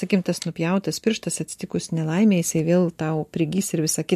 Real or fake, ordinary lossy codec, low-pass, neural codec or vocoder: real; MP3, 64 kbps; 14.4 kHz; none